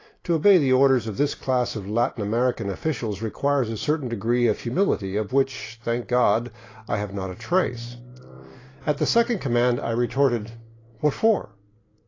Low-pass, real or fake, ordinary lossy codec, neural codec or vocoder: 7.2 kHz; real; AAC, 32 kbps; none